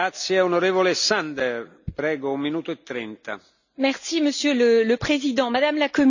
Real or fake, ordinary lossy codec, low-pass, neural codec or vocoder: real; none; 7.2 kHz; none